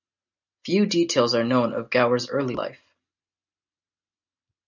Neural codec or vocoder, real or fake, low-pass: none; real; 7.2 kHz